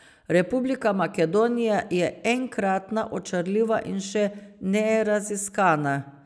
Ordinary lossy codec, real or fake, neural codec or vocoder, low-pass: none; real; none; none